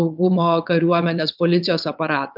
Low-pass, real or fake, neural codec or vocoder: 5.4 kHz; fake; vocoder, 22.05 kHz, 80 mel bands, WaveNeXt